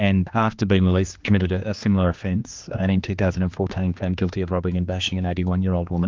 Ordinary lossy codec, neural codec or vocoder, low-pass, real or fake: Opus, 24 kbps; codec, 16 kHz, 2 kbps, X-Codec, HuBERT features, trained on general audio; 7.2 kHz; fake